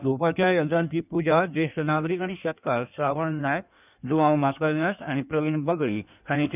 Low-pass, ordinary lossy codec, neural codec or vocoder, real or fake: 3.6 kHz; none; codec, 16 kHz in and 24 kHz out, 1.1 kbps, FireRedTTS-2 codec; fake